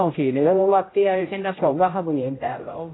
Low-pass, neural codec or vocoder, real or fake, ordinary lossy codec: 7.2 kHz; codec, 16 kHz, 0.5 kbps, X-Codec, HuBERT features, trained on general audio; fake; AAC, 16 kbps